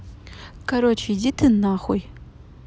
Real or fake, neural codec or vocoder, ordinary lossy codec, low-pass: real; none; none; none